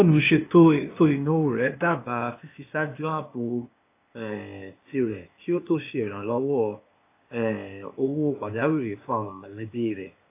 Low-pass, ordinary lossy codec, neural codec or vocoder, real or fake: 3.6 kHz; AAC, 24 kbps; codec, 16 kHz, 0.8 kbps, ZipCodec; fake